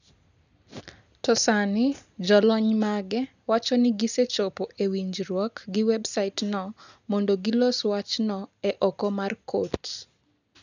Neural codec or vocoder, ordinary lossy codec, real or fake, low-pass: none; none; real; 7.2 kHz